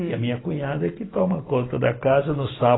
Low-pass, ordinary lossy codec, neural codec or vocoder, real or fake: 7.2 kHz; AAC, 16 kbps; none; real